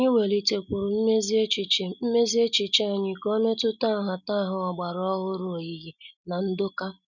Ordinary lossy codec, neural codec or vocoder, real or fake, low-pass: none; none; real; 7.2 kHz